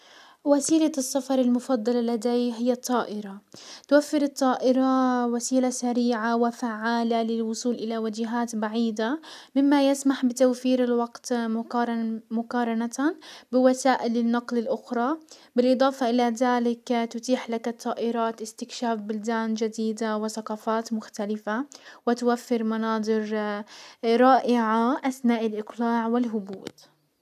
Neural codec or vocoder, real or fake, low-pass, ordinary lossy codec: none; real; 14.4 kHz; none